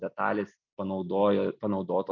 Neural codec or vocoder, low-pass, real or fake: none; 7.2 kHz; real